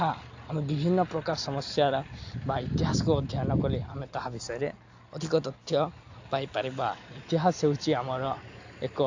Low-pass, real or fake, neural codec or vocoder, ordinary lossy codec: 7.2 kHz; real; none; AAC, 48 kbps